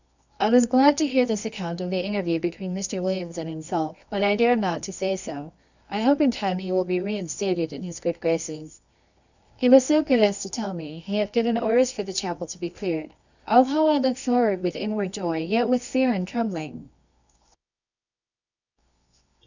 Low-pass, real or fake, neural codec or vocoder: 7.2 kHz; fake; codec, 24 kHz, 0.9 kbps, WavTokenizer, medium music audio release